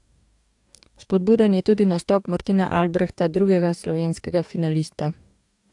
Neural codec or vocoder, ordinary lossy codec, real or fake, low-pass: codec, 44.1 kHz, 2.6 kbps, DAC; AAC, 64 kbps; fake; 10.8 kHz